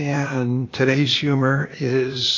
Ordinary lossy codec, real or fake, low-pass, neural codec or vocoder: AAC, 32 kbps; fake; 7.2 kHz; codec, 16 kHz, 0.8 kbps, ZipCodec